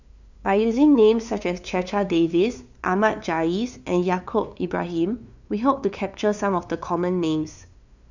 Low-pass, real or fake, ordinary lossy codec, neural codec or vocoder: 7.2 kHz; fake; none; codec, 16 kHz, 2 kbps, FunCodec, trained on LibriTTS, 25 frames a second